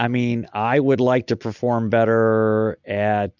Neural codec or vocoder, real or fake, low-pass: none; real; 7.2 kHz